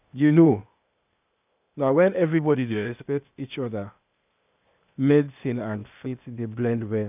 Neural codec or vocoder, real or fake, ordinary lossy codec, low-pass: codec, 16 kHz, 0.8 kbps, ZipCodec; fake; none; 3.6 kHz